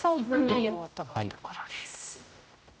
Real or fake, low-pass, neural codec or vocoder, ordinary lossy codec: fake; none; codec, 16 kHz, 0.5 kbps, X-Codec, HuBERT features, trained on general audio; none